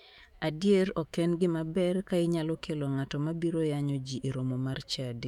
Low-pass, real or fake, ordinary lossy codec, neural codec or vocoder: 19.8 kHz; fake; none; autoencoder, 48 kHz, 128 numbers a frame, DAC-VAE, trained on Japanese speech